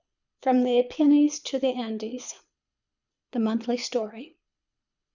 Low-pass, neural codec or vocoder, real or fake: 7.2 kHz; codec, 24 kHz, 6 kbps, HILCodec; fake